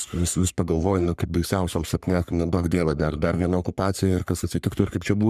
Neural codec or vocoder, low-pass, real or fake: codec, 44.1 kHz, 3.4 kbps, Pupu-Codec; 14.4 kHz; fake